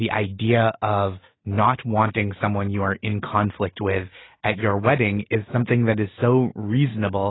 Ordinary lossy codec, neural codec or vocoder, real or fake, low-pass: AAC, 16 kbps; none; real; 7.2 kHz